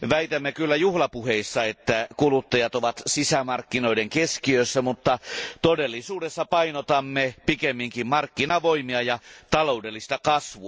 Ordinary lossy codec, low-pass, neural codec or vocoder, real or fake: none; none; none; real